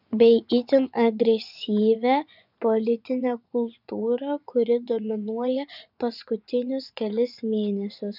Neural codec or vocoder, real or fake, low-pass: vocoder, 24 kHz, 100 mel bands, Vocos; fake; 5.4 kHz